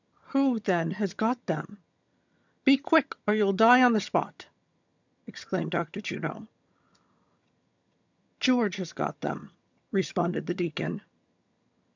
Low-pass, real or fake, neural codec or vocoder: 7.2 kHz; fake; vocoder, 22.05 kHz, 80 mel bands, HiFi-GAN